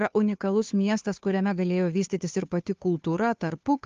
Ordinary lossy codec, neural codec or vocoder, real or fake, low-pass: Opus, 32 kbps; codec, 16 kHz, 2 kbps, FunCodec, trained on Chinese and English, 25 frames a second; fake; 7.2 kHz